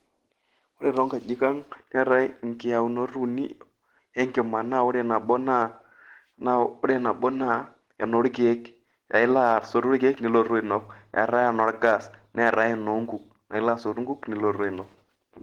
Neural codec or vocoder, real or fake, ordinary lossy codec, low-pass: none; real; Opus, 16 kbps; 19.8 kHz